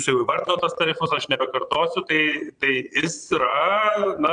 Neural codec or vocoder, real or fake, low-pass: vocoder, 22.05 kHz, 80 mel bands, Vocos; fake; 9.9 kHz